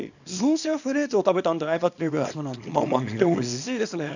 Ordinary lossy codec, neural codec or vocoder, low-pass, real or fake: none; codec, 24 kHz, 0.9 kbps, WavTokenizer, small release; 7.2 kHz; fake